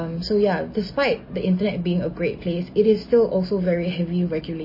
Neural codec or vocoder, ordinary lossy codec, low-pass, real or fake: none; MP3, 24 kbps; 5.4 kHz; real